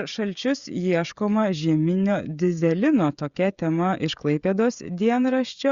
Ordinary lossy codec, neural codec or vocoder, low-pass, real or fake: Opus, 64 kbps; codec, 16 kHz, 16 kbps, FreqCodec, smaller model; 7.2 kHz; fake